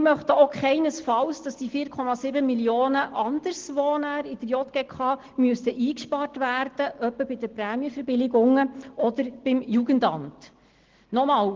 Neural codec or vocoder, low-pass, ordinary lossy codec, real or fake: none; 7.2 kHz; Opus, 16 kbps; real